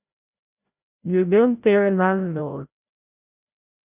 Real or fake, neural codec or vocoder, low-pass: fake; codec, 16 kHz, 0.5 kbps, FreqCodec, larger model; 3.6 kHz